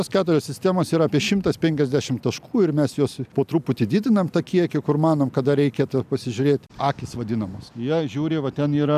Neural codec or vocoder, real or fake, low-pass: none; real; 14.4 kHz